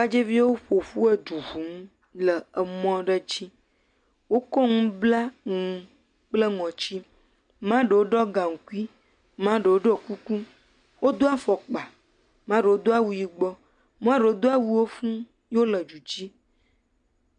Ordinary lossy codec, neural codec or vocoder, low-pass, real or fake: MP3, 64 kbps; none; 9.9 kHz; real